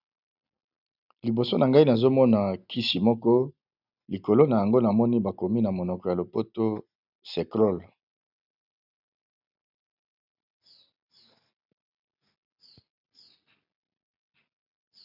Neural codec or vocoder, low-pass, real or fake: none; 5.4 kHz; real